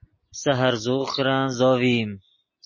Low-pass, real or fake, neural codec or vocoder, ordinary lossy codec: 7.2 kHz; real; none; MP3, 32 kbps